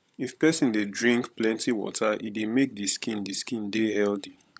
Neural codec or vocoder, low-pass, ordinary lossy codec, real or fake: codec, 16 kHz, 16 kbps, FunCodec, trained on LibriTTS, 50 frames a second; none; none; fake